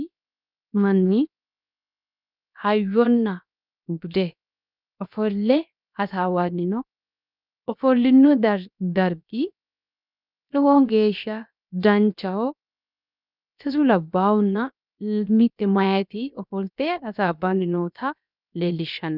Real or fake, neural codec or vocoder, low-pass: fake; codec, 16 kHz, 0.7 kbps, FocalCodec; 5.4 kHz